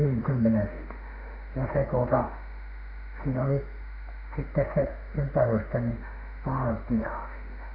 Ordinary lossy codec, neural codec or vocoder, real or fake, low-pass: none; autoencoder, 48 kHz, 32 numbers a frame, DAC-VAE, trained on Japanese speech; fake; 5.4 kHz